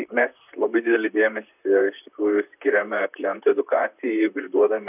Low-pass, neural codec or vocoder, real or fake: 3.6 kHz; vocoder, 44.1 kHz, 128 mel bands, Pupu-Vocoder; fake